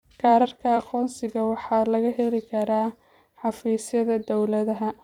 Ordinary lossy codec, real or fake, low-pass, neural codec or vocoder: none; fake; 19.8 kHz; vocoder, 44.1 kHz, 128 mel bands every 256 samples, BigVGAN v2